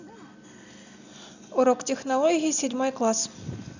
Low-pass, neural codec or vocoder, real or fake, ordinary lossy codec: 7.2 kHz; none; real; none